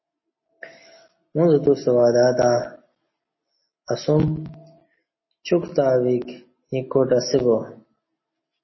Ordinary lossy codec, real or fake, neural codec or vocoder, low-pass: MP3, 24 kbps; real; none; 7.2 kHz